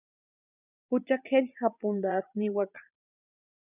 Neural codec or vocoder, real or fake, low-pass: vocoder, 44.1 kHz, 80 mel bands, Vocos; fake; 3.6 kHz